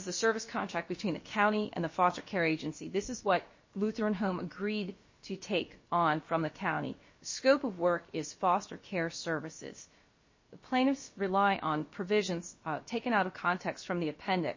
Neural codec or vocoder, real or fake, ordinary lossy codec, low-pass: codec, 16 kHz, about 1 kbps, DyCAST, with the encoder's durations; fake; MP3, 32 kbps; 7.2 kHz